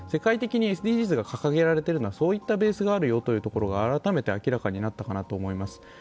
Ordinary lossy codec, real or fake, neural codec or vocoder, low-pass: none; real; none; none